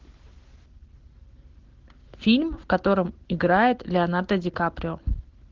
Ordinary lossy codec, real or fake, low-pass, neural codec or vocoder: Opus, 16 kbps; real; 7.2 kHz; none